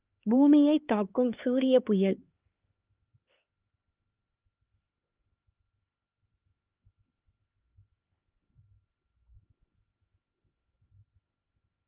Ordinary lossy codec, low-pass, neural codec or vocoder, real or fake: Opus, 32 kbps; 3.6 kHz; codec, 16 kHz, 2 kbps, X-Codec, HuBERT features, trained on LibriSpeech; fake